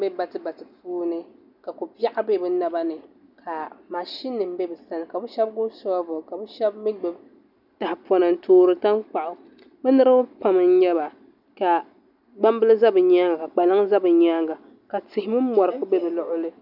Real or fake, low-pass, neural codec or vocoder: real; 5.4 kHz; none